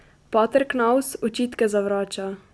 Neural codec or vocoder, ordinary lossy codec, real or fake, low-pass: none; none; real; none